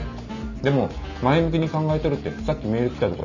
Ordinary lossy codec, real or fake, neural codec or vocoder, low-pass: none; real; none; 7.2 kHz